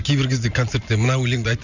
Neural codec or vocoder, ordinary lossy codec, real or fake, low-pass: none; none; real; 7.2 kHz